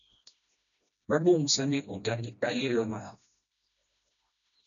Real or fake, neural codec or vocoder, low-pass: fake; codec, 16 kHz, 1 kbps, FreqCodec, smaller model; 7.2 kHz